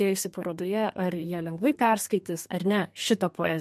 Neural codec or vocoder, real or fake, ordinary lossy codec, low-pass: codec, 32 kHz, 1.9 kbps, SNAC; fake; MP3, 64 kbps; 14.4 kHz